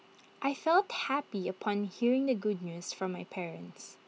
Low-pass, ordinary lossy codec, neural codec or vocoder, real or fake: none; none; none; real